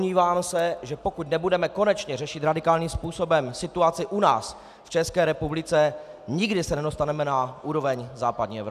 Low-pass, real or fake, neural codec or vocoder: 14.4 kHz; real; none